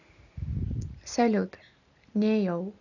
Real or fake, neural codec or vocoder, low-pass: real; none; 7.2 kHz